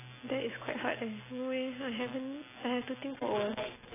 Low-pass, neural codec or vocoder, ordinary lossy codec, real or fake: 3.6 kHz; none; AAC, 16 kbps; real